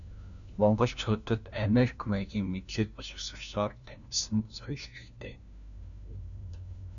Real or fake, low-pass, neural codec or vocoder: fake; 7.2 kHz; codec, 16 kHz, 1 kbps, FunCodec, trained on LibriTTS, 50 frames a second